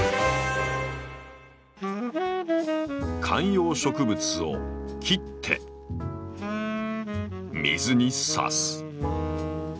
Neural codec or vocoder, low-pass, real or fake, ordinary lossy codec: none; none; real; none